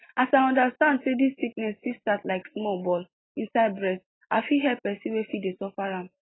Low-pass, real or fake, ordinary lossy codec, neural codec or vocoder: 7.2 kHz; real; AAC, 16 kbps; none